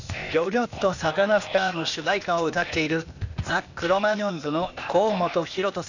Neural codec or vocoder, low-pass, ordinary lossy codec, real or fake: codec, 16 kHz, 0.8 kbps, ZipCodec; 7.2 kHz; none; fake